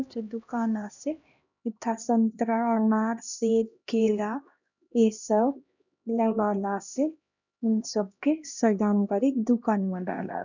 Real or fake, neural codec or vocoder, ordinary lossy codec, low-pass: fake; codec, 16 kHz, 1 kbps, X-Codec, HuBERT features, trained on LibriSpeech; none; 7.2 kHz